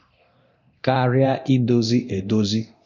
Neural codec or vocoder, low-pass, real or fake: codec, 24 kHz, 0.9 kbps, DualCodec; 7.2 kHz; fake